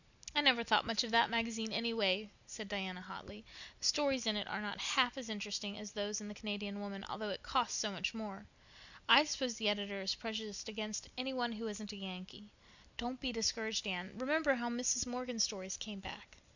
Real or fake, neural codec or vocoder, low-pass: real; none; 7.2 kHz